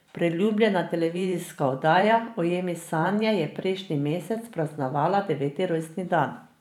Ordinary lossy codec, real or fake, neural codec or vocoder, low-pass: none; fake; vocoder, 44.1 kHz, 128 mel bands every 512 samples, BigVGAN v2; 19.8 kHz